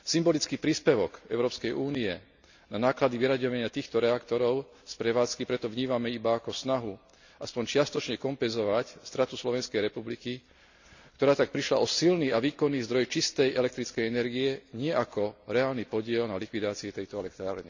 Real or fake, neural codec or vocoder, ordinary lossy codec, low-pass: real; none; none; 7.2 kHz